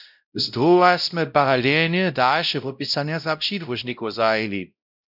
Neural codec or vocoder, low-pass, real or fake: codec, 16 kHz, 0.5 kbps, X-Codec, WavLM features, trained on Multilingual LibriSpeech; 5.4 kHz; fake